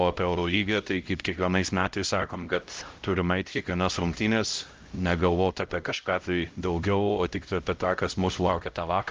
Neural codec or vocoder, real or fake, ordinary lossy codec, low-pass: codec, 16 kHz, 0.5 kbps, X-Codec, HuBERT features, trained on LibriSpeech; fake; Opus, 32 kbps; 7.2 kHz